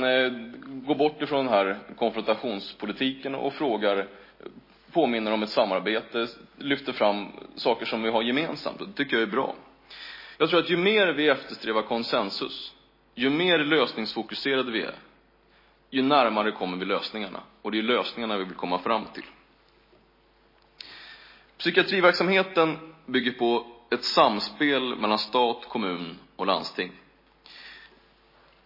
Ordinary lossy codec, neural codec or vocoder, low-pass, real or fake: MP3, 24 kbps; none; 5.4 kHz; real